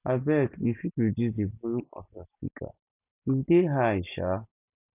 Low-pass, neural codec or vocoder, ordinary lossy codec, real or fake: 3.6 kHz; none; none; real